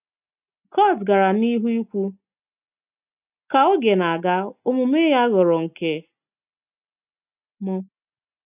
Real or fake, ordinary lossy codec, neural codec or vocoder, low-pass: real; none; none; 3.6 kHz